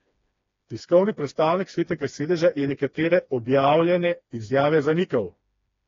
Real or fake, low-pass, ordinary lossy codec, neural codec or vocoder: fake; 7.2 kHz; AAC, 32 kbps; codec, 16 kHz, 2 kbps, FreqCodec, smaller model